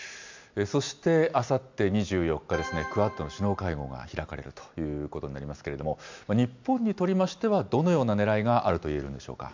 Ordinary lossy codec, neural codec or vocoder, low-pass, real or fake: MP3, 64 kbps; none; 7.2 kHz; real